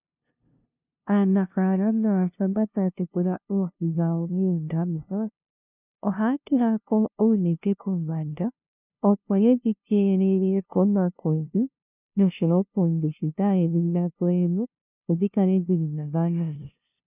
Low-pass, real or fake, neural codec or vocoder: 3.6 kHz; fake; codec, 16 kHz, 0.5 kbps, FunCodec, trained on LibriTTS, 25 frames a second